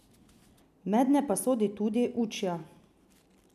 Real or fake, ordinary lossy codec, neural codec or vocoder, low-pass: real; none; none; 14.4 kHz